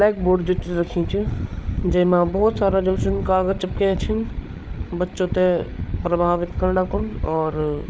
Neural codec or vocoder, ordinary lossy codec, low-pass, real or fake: codec, 16 kHz, 8 kbps, FreqCodec, larger model; none; none; fake